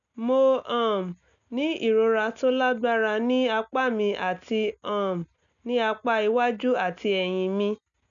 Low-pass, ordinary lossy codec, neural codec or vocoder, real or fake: 7.2 kHz; none; none; real